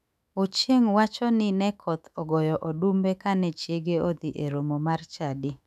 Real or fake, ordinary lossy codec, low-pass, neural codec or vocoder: fake; none; 14.4 kHz; autoencoder, 48 kHz, 128 numbers a frame, DAC-VAE, trained on Japanese speech